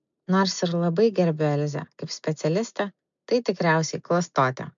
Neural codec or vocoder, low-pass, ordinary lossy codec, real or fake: none; 7.2 kHz; MP3, 64 kbps; real